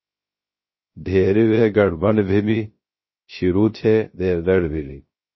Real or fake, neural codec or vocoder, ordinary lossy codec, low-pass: fake; codec, 16 kHz, 0.3 kbps, FocalCodec; MP3, 24 kbps; 7.2 kHz